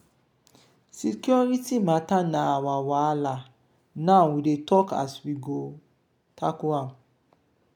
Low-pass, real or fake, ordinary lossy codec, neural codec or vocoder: 19.8 kHz; real; none; none